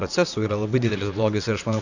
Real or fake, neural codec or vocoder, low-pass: fake; vocoder, 44.1 kHz, 128 mel bands, Pupu-Vocoder; 7.2 kHz